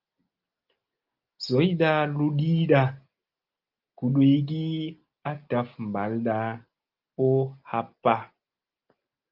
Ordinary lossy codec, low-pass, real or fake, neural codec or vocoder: Opus, 24 kbps; 5.4 kHz; real; none